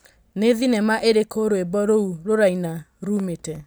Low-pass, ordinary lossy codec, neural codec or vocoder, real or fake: none; none; none; real